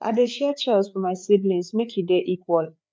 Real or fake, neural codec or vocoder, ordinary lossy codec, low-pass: fake; codec, 16 kHz, 4 kbps, FreqCodec, larger model; none; none